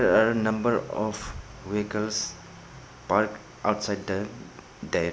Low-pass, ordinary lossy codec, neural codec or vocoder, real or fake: none; none; none; real